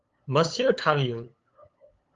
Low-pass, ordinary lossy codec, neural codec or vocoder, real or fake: 7.2 kHz; Opus, 32 kbps; codec, 16 kHz, 8 kbps, FunCodec, trained on LibriTTS, 25 frames a second; fake